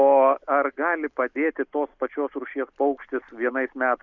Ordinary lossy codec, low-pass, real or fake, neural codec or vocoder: Opus, 64 kbps; 7.2 kHz; real; none